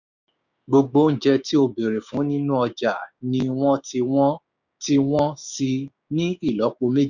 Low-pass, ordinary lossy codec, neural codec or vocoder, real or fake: 7.2 kHz; none; codec, 16 kHz, 6 kbps, DAC; fake